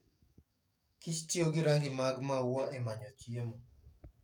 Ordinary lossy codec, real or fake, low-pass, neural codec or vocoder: none; fake; 19.8 kHz; codec, 44.1 kHz, 7.8 kbps, DAC